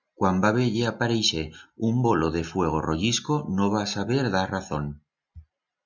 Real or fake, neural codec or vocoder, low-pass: real; none; 7.2 kHz